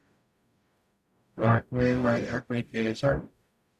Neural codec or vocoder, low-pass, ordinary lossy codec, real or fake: codec, 44.1 kHz, 0.9 kbps, DAC; 14.4 kHz; none; fake